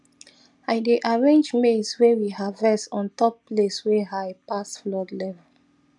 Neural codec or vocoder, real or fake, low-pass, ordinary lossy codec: none; real; 10.8 kHz; none